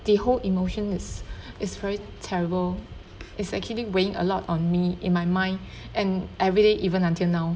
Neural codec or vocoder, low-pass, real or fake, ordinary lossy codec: none; none; real; none